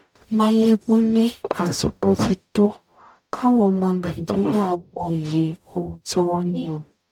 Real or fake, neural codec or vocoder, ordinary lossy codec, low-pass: fake; codec, 44.1 kHz, 0.9 kbps, DAC; none; 19.8 kHz